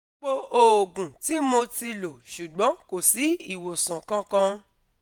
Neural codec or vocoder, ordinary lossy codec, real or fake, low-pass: vocoder, 48 kHz, 128 mel bands, Vocos; none; fake; none